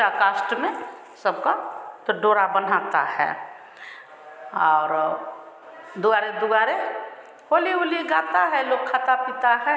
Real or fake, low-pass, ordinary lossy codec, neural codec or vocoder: real; none; none; none